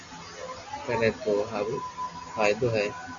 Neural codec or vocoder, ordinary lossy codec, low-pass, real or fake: none; MP3, 64 kbps; 7.2 kHz; real